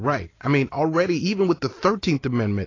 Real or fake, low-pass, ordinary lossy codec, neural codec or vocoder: real; 7.2 kHz; AAC, 32 kbps; none